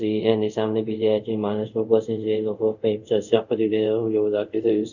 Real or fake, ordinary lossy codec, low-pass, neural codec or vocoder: fake; none; 7.2 kHz; codec, 24 kHz, 0.5 kbps, DualCodec